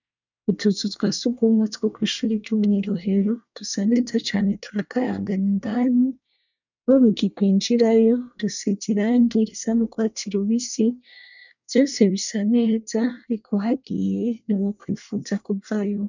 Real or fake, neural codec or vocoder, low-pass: fake; codec, 24 kHz, 1 kbps, SNAC; 7.2 kHz